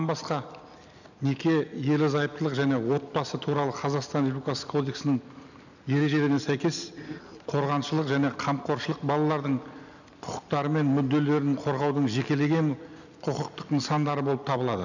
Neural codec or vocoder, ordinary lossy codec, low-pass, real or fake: none; none; 7.2 kHz; real